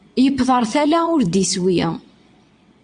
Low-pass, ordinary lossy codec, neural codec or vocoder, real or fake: 9.9 kHz; MP3, 64 kbps; vocoder, 22.05 kHz, 80 mel bands, WaveNeXt; fake